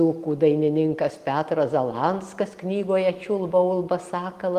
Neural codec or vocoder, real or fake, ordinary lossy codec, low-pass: none; real; Opus, 24 kbps; 14.4 kHz